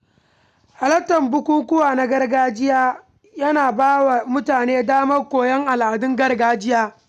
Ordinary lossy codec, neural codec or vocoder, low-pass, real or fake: none; none; 14.4 kHz; real